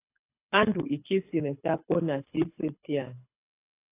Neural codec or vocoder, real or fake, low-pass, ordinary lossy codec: codec, 24 kHz, 6 kbps, HILCodec; fake; 3.6 kHz; AAC, 24 kbps